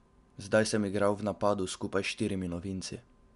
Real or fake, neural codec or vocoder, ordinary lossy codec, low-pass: real; none; MP3, 96 kbps; 10.8 kHz